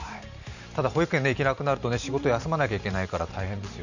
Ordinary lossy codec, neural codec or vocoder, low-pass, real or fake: none; vocoder, 44.1 kHz, 128 mel bands every 512 samples, BigVGAN v2; 7.2 kHz; fake